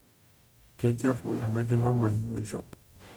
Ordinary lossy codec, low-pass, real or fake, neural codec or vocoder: none; none; fake; codec, 44.1 kHz, 0.9 kbps, DAC